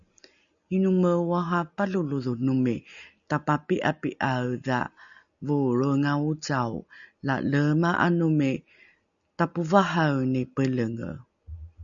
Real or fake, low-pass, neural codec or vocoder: real; 7.2 kHz; none